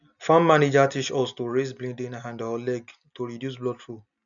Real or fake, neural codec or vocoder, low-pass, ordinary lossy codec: real; none; 7.2 kHz; none